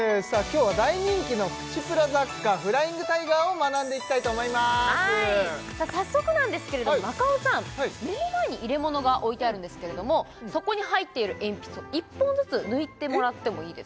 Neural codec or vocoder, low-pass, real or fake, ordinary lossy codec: none; none; real; none